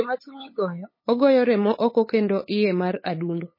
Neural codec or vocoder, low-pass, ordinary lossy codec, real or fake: codec, 16 kHz, 8 kbps, FunCodec, trained on LibriTTS, 25 frames a second; 5.4 kHz; MP3, 24 kbps; fake